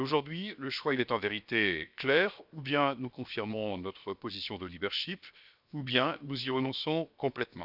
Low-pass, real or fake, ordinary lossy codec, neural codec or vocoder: 5.4 kHz; fake; none; codec, 16 kHz, 0.7 kbps, FocalCodec